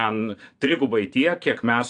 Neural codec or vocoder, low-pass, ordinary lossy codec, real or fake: vocoder, 22.05 kHz, 80 mel bands, Vocos; 9.9 kHz; AAC, 64 kbps; fake